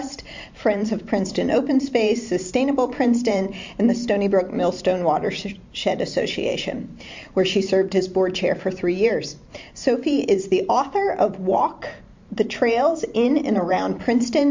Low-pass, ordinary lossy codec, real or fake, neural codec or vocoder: 7.2 kHz; MP3, 48 kbps; fake; vocoder, 44.1 kHz, 128 mel bands every 512 samples, BigVGAN v2